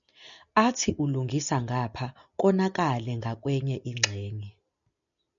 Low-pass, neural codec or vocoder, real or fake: 7.2 kHz; none; real